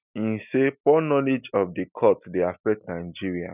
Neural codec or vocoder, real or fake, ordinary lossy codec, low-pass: none; real; none; 3.6 kHz